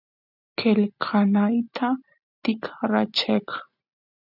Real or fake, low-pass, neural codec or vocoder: real; 5.4 kHz; none